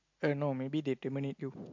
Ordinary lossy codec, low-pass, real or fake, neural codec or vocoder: MP3, 48 kbps; 7.2 kHz; real; none